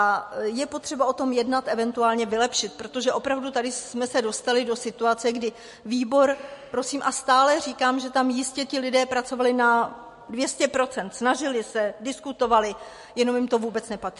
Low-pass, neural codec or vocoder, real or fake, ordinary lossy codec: 14.4 kHz; none; real; MP3, 48 kbps